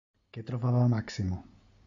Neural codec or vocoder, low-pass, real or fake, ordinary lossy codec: none; 7.2 kHz; real; AAC, 64 kbps